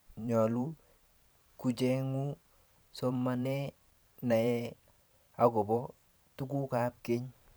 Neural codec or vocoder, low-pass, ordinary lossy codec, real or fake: vocoder, 44.1 kHz, 128 mel bands every 512 samples, BigVGAN v2; none; none; fake